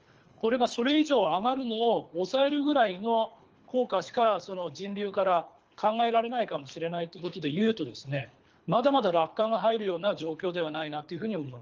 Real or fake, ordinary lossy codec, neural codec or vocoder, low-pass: fake; Opus, 24 kbps; codec, 24 kHz, 3 kbps, HILCodec; 7.2 kHz